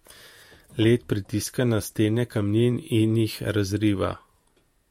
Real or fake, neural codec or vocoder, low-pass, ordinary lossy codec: fake; vocoder, 48 kHz, 128 mel bands, Vocos; 19.8 kHz; MP3, 64 kbps